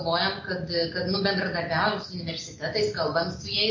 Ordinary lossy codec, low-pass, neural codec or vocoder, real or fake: MP3, 32 kbps; 7.2 kHz; none; real